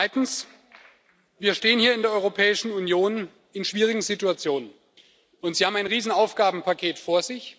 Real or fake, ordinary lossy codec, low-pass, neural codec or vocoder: real; none; none; none